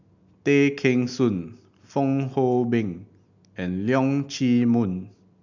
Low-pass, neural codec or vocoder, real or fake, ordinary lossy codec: 7.2 kHz; none; real; none